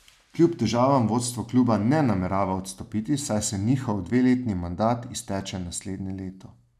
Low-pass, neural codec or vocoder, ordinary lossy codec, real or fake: 14.4 kHz; none; none; real